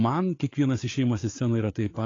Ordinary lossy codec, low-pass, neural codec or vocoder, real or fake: AAC, 32 kbps; 7.2 kHz; codec, 16 kHz, 8 kbps, FreqCodec, larger model; fake